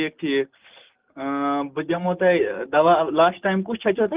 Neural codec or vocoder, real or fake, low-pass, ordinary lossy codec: none; real; 3.6 kHz; Opus, 32 kbps